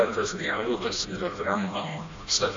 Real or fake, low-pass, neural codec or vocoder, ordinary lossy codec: fake; 7.2 kHz; codec, 16 kHz, 1 kbps, FreqCodec, smaller model; AAC, 48 kbps